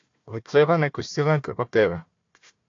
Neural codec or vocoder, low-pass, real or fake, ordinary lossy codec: codec, 16 kHz, 1 kbps, FunCodec, trained on Chinese and English, 50 frames a second; 7.2 kHz; fake; AAC, 48 kbps